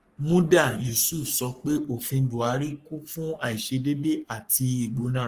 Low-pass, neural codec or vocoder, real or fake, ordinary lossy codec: 14.4 kHz; codec, 44.1 kHz, 3.4 kbps, Pupu-Codec; fake; Opus, 32 kbps